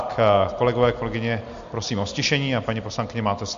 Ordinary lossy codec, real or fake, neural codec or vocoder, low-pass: MP3, 48 kbps; real; none; 7.2 kHz